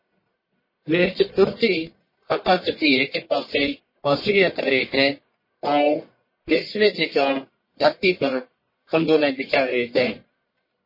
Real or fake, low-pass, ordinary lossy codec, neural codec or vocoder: fake; 5.4 kHz; MP3, 24 kbps; codec, 44.1 kHz, 1.7 kbps, Pupu-Codec